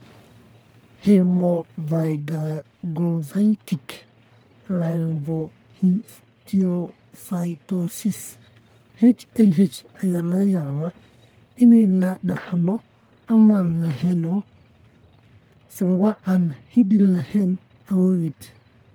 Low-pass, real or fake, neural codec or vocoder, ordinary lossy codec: none; fake; codec, 44.1 kHz, 1.7 kbps, Pupu-Codec; none